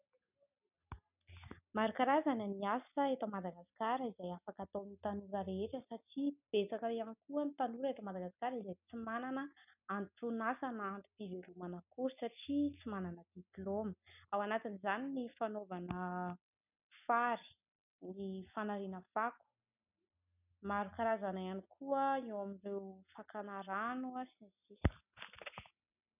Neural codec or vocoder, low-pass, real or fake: none; 3.6 kHz; real